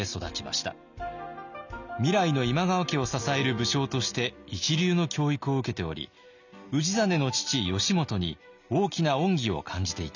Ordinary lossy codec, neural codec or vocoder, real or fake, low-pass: none; none; real; 7.2 kHz